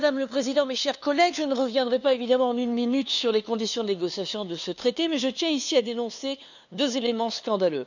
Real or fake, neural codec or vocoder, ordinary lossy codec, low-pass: fake; codec, 16 kHz, 2 kbps, FunCodec, trained on LibriTTS, 25 frames a second; none; 7.2 kHz